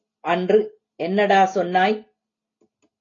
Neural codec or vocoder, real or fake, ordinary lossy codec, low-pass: none; real; AAC, 32 kbps; 7.2 kHz